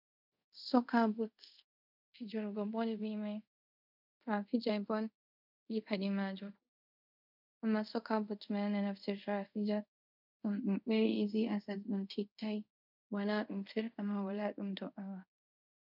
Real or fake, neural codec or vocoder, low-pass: fake; codec, 24 kHz, 0.5 kbps, DualCodec; 5.4 kHz